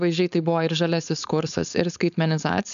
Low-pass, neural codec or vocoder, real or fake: 7.2 kHz; codec, 16 kHz, 4.8 kbps, FACodec; fake